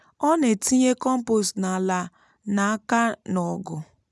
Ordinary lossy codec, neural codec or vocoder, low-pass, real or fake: none; none; none; real